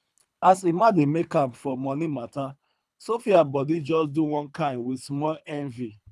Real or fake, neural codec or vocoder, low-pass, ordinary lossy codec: fake; codec, 24 kHz, 3 kbps, HILCodec; none; none